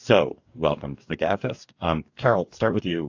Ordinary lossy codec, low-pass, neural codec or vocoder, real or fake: AAC, 48 kbps; 7.2 kHz; codec, 44.1 kHz, 2.6 kbps, DAC; fake